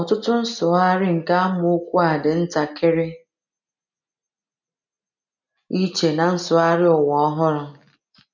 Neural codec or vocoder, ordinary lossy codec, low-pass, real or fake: none; none; 7.2 kHz; real